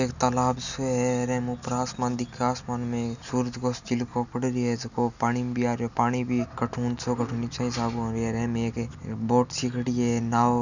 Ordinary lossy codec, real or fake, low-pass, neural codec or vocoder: none; real; 7.2 kHz; none